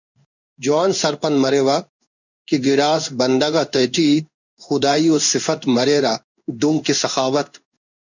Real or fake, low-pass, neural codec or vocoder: fake; 7.2 kHz; codec, 16 kHz in and 24 kHz out, 1 kbps, XY-Tokenizer